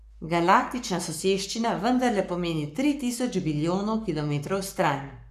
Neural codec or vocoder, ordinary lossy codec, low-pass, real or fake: codec, 44.1 kHz, 7.8 kbps, Pupu-Codec; none; 14.4 kHz; fake